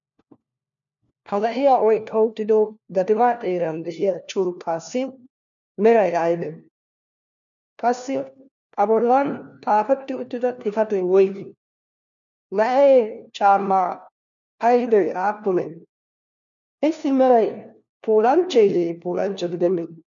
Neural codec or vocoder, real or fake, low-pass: codec, 16 kHz, 1 kbps, FunCodec, trained on LibriTTS, 50 frames a second; fake; 7.2 kHz